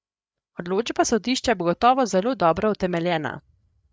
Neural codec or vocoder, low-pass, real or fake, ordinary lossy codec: codec, 16 kHz, 8 kbps, FreqCodec, larger model; none; fake; none